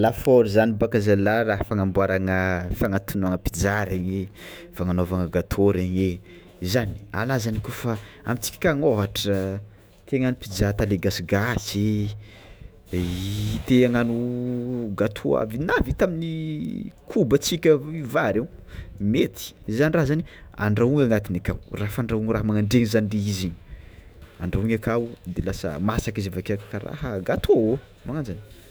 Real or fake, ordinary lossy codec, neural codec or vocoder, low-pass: fake; none; autoencoder, 48 kHz, 128 numbers a frame, DAC-VAE, trained on Japanese speech; none